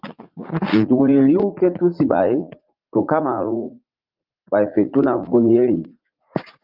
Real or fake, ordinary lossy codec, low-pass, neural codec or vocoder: fake; Opus, 32 kbps; 5.4 kHz; vocoder, 44.1 kHz, 80 mel bands, Vocos